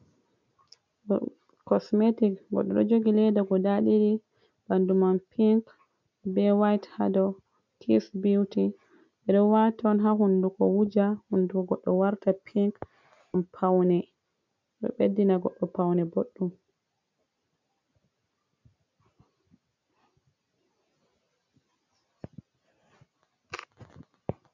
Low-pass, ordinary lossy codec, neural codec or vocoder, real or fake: 7.2 kHz; MP3, 64 kbps; none; real